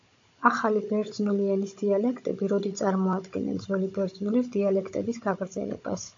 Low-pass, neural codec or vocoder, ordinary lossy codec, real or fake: 7.2 kHz; codec, 16 kHz, 16 kbps, FunCodec, trained on Chinese and English, 50 frames a second; AAC, 48 kbps; fake